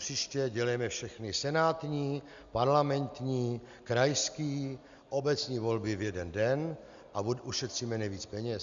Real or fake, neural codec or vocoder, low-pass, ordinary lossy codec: real; none; 7.2 kHz; Opus, 64 kbps